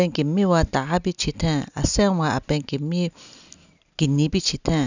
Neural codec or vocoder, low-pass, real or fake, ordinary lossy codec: none; 7.2 kHz; real; none